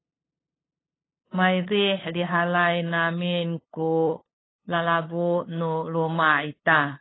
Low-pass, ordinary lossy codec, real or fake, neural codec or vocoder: 7.2 kHz; AAC, 16 kbps; fake; codec, 16 kHz, 8 kbps, FunCodec, trained on LibriTTS, 25 frames a second